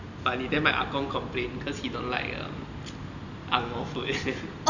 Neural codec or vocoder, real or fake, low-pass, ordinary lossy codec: none; real; 7.2 kHz; none